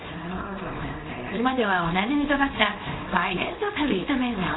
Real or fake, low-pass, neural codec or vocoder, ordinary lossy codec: fake; 7.2 kHz; codec, 24 kHz, 0.9 kbps, WavTokenizer, small release; AAC, 16 kbps